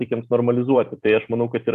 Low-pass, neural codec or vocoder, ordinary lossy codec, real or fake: 14.4 kHz; vocoder, 44.1 kHz, 128 mel bands every 256 samples, BigVGAN v2; AAC, 96 kbps; fake